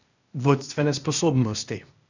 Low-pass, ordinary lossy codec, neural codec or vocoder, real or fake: 7.2 kHz; none; codec, 16 kHz, 0.8 kbps, ZipCodec; fake